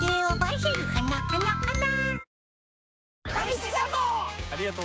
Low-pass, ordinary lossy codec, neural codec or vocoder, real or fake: none; none; codec, 16 kHz, 6 kbps, DAC; fake